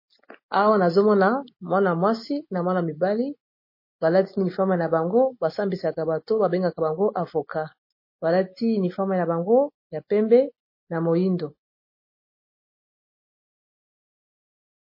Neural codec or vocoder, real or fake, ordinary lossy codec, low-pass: none; real; MP3, 24 kbps; 5.4 kHz